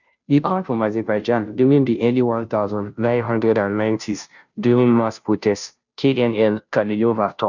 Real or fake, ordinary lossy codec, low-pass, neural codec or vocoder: fake; none; 7.2 kHz; codec, 16 kHz, 0.5 kbps, FunCodec, trained on Chinese and English, 25 frames a second